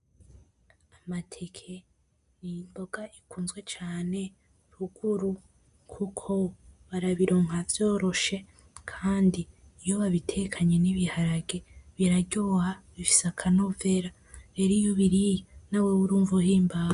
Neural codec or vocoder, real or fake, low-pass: none; real; 10.8 kHz